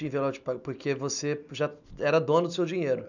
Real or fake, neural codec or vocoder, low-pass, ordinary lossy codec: real; none; 7.2 kHz; none